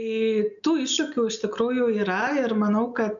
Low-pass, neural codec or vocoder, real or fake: 7.2 kHz; none; real